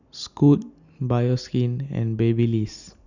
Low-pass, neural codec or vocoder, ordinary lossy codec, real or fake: 7.2 kHz; none; none; real